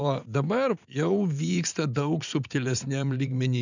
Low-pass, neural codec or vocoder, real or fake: 7.2 kHz; vocoder, 44.1 kHz, 80 mel bands, Vocos; fake